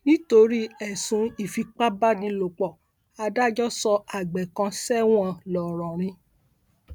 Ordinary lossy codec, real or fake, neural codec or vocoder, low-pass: none; real; none; none